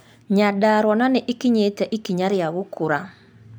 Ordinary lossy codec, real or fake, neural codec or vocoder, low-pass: none; real; none; none